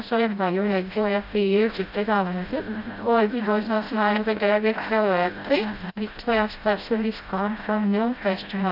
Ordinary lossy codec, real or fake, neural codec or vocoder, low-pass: AAC, 48 kbps; fake; codec, 16 kHz, 0.5 kbps, FreqCodec, smaller model; 5.4 kHz